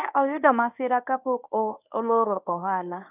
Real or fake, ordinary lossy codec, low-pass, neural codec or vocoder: fake; none; 3.6 kHz; codec, 24 kHz, 0.9 kbps, WavTokenizer, medium speech release version 1